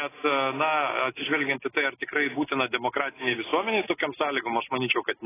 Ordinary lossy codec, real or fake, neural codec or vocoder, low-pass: AAC, 16 kbps; real; none; 3.6 kHz